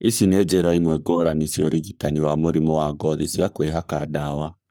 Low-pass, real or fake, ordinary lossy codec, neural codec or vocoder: none; fake; none; codec, 44.1 kHz, 3.4 kbps, Pupu-Codec